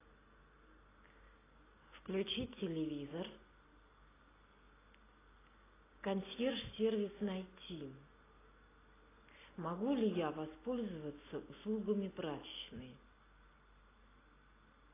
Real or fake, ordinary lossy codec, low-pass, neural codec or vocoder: real; AAC, 16 kbps; 3.6 kHz; none